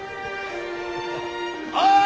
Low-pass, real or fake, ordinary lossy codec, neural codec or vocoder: none; real; none; none